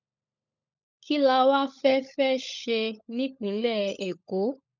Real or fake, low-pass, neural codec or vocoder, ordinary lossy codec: fake; 7.2 kHz; codec, 16 kHz, 16 kbps, FunCodec, trained on LibriTTS, 50 frames a second; none